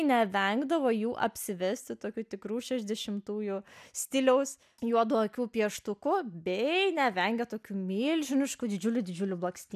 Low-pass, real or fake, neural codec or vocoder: 14.4 kHz; real; none